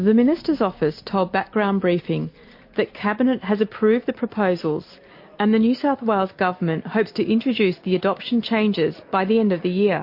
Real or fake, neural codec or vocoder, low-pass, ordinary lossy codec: real; none; 5.4 kHz; MP3, 32 kbps